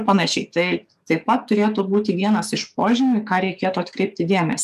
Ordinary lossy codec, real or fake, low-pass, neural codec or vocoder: MP3, 96 kbps; fake; 14.4 kHz; codec, 44.1 kHz, 7.8 kbps, DAC